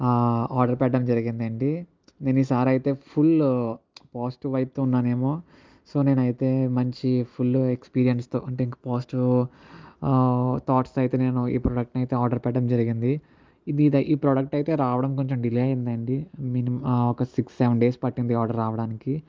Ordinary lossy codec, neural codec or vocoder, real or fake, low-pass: Opus, 24 kbps; none; real; 7.2 kHz